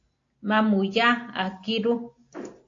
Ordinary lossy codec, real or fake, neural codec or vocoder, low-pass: AAC, 48 kbps; real; none; 7.2 kHz